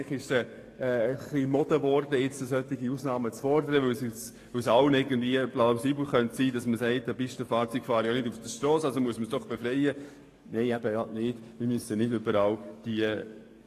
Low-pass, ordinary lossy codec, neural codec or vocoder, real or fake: 14.4 kHz; AAC, 48 kbps; codec, 44.1 kHz, 7.8 kbps, DAC; fake